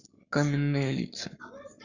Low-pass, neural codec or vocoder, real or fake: 7.2 kHz; codec, 44.1 kHz, 7.8 kbps, Pupu-Codec; fake